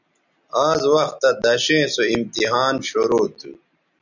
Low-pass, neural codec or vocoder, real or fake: 7.2 kHz; none; real